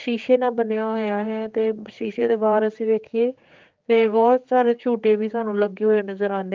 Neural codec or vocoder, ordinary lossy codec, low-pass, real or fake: codec, 16 kHz, 4 kbps, X-Codec, HuBERT features, trained on general audio; Opus, 24 kbps; 7.2 kHz; fake